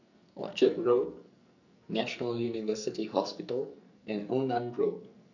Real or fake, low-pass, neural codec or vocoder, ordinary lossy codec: fake; 7.2 kHz; codec, 44.1 kHz, 2.6 kbps, SNAC; none